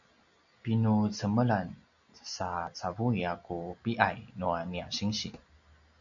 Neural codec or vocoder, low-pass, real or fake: none; 7.2 kHz; real